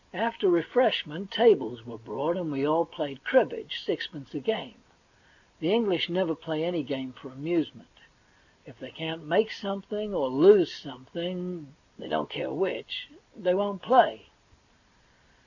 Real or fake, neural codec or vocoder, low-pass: real; none; 7.2 kHz